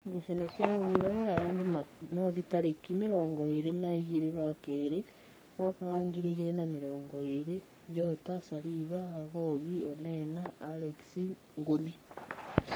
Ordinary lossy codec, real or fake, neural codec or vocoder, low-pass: none; fake; codec, 44.1 kHz, 3.4 kbps, Pupu-Codec; none